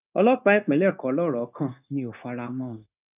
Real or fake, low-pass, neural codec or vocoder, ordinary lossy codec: fake; 3.6 kHz; codec, 16 kHz, 0.9 kbps, LongCat-Audio-Codec; none